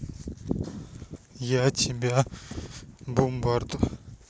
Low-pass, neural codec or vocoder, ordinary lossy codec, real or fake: none; none; none; real